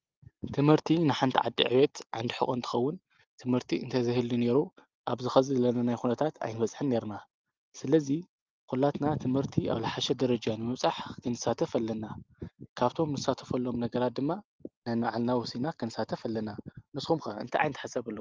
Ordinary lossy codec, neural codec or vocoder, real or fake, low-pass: Opus, 32 kbps; none; real; 7.2 kHz